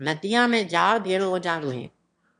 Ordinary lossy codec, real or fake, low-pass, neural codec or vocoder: MP3, 64 kbps; fake; 9.9 kHz; autoencoder, 22.05 kHz, a latent of 192 numbers a frame, VITS, trained on one speaker